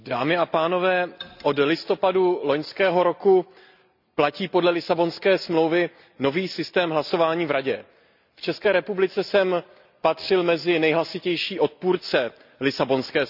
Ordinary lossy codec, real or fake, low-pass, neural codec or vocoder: none; real; 5.4 kHz; none